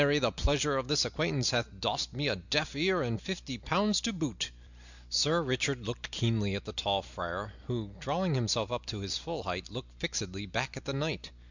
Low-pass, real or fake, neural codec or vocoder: 7.2 kHz; real; none